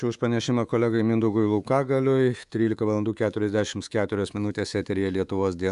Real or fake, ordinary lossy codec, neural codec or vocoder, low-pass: fake; Opus, 64 kbps; codec, 24 kHz, 3.1 kbps, DualCodec; 10.8 kHz